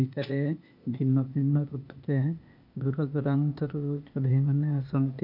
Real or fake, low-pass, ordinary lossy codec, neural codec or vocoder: fake; 5.4 kHz; none; codec, 16 kHz, 0.8 kbps, ZipCodec